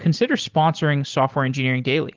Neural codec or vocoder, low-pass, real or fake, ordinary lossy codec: none; 7.2 kHz; real; Opus, 16 kbps